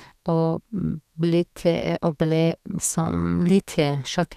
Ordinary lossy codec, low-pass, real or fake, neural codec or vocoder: none; 14.4 kHz; fake; codec, 32 kHz, 1.9 kbps, SNAC